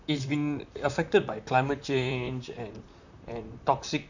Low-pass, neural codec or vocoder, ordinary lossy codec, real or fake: 7.2 kHz; vocoder, 44.1 kHz, 128 mel bands, Pupu-Vocoder; none; fake